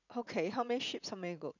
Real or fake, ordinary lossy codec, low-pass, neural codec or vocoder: fake; none; 7.2 kHz; vocoder, 44.1 kHz, 80 mel bands, Vocos